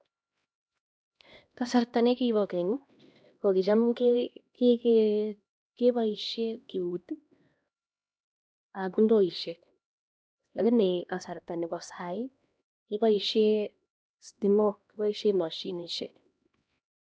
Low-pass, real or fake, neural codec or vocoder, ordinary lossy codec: none; fake; codec, 16 kHz, 1 kbps, X-Codec, HuBERT features, trained on LibriSpeech; none